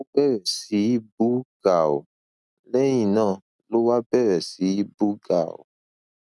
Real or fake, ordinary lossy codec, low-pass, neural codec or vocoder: real; none; none; none